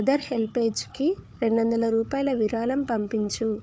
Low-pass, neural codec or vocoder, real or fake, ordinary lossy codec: none; codec, 16 kHz, 4 kbps, FunCodec, trained on Chinese and English, 50 frames a second; fake; none